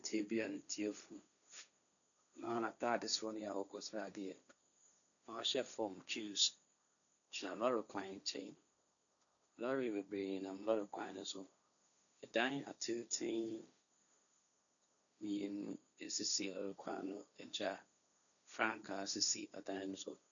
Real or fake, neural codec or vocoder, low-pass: fake; codec, 16 kHz, 1.1 kbps, Voila-Tokenizer; 7.2 kHz